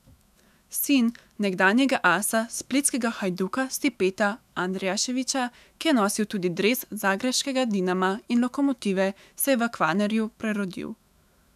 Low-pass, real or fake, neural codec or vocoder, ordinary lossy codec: 14.4 kHz; fake; autoencoder, 48 kHz, 128 numbers a frame, DAC-VAE, trained on Japanese speech; none